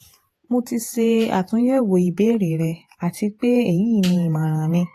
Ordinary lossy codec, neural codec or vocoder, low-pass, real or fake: AAC, 48 kbps; vocoder, 48 kHz, 128 mel bands, Vocos; 14.4 kHz; fake